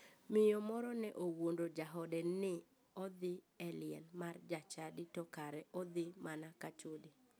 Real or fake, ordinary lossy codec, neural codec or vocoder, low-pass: real; none; none; none